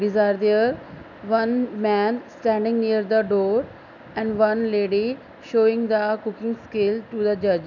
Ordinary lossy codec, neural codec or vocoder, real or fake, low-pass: none; none; real; 7.2 kHz